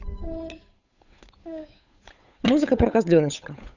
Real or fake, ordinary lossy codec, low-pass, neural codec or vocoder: fake; none; 7.2 kHz; codec, 16 kHz, 8 kbps, FunCodec, trained on Chinese and English, 25 frames a second